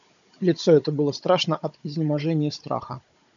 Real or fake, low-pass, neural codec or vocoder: fake; 7.2 kHz; codec, 16 kHz, 16 kbps, FunCodec, trained on Chinese and English, 50 frames a second